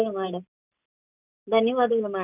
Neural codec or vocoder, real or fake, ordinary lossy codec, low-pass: none; real; none; 3.6 kHz